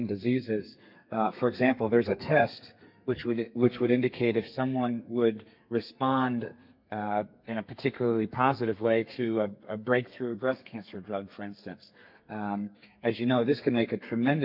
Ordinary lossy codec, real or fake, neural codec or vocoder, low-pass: MP3, 48 kbps; fake; codec, 32 kHz, 1.9 kbps, SNAC; 5.4 kHz